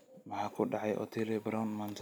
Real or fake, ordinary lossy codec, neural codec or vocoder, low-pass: real; none; none; none